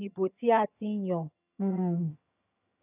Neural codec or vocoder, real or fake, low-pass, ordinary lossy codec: vocoder, 22.05 kHz, 80 mel bands, HiFi-GAN; fake; 3.6 kHz; none